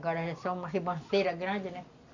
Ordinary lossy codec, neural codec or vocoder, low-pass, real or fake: AAC, 48 kbps; none; 7.2 kHz; real